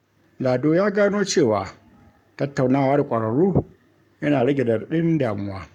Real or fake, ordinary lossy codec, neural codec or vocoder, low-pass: fake; none; codec, 44.1 kHz, 7.8 kbps, Pupu-Codec; 19.8 kHz